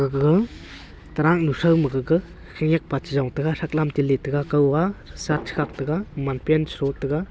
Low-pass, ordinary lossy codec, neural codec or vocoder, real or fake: none; none; none; real